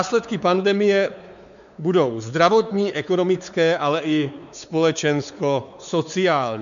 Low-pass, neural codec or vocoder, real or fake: 7.2 kHz; codec, 16 kHz, 4 kbps, X-Codec, WavLM features, trained on Multilingual LibriSpeech; fake